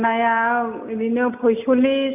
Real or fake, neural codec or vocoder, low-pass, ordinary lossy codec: real; none; 3.6 kHz; none